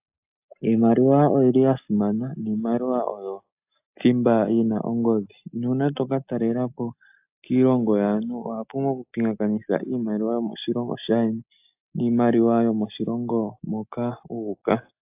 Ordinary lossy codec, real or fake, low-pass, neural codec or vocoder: AAC, 32 kbps; real; 3.6 kHz; none